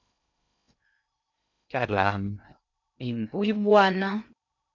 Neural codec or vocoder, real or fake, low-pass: codec, 16 kHz in and 24 kHz out, 0.6 kbps, FocalCodec, streaming, 2048 codes; fake; 7.2 kHz